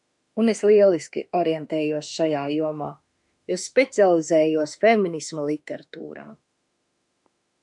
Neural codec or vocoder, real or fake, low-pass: autoencoder, 48 kHz, 32 numbers a frame, DAC-VAE, trained on Japanese speech; fake; 10.8 kHz